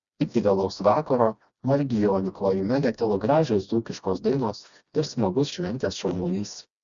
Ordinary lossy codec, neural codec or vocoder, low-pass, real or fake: Opus, 64 kbps; codec, 16 kHz, 1 kbps, FreqCodec, smaller model; 7.2 kHz; fake